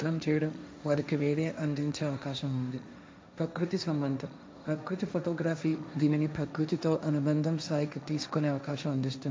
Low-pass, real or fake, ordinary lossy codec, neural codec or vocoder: none; fake; none; codec, 16 kHz, 1.1 kbps, Voila-Tokenizer